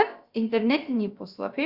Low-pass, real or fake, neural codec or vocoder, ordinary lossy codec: 5.4 kHz; fake; codec, 16 kHz, about 1 kbps, DyCAST, with the encoder's durations; Opus, 64 kbps